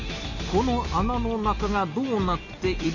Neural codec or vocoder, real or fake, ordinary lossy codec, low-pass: none; real; none; 7.2 kHz